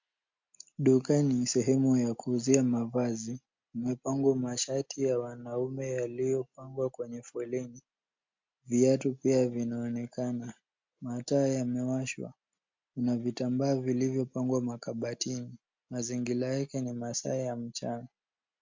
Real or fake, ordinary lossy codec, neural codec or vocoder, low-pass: real; MP3, 48 kbps; none; 7.2 kHz